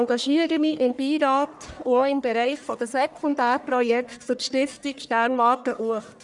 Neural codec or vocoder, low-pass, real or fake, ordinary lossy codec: codec, 44.1 kHz, 1.7 kbps, Pupu-Codec; 10.8 kHz; fake; none